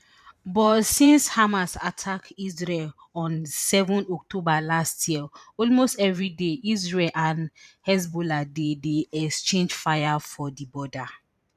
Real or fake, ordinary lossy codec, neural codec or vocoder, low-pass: fake; none; vocoder, 44.1 kHz, 128 mel bands every 512 samples, BigVGAN v2; 14.4 kHz